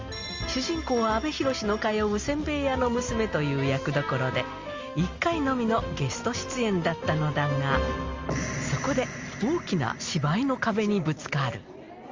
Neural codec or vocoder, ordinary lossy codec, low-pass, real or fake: none; Opus, 32 kbps; 7.2 kHz; real